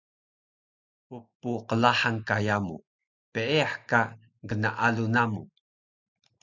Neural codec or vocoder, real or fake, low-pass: none; real; 7.2 kHz